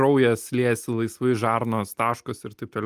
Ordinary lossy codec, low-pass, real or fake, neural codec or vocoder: Opus, 32 kbps; 14.4 kHz; real; none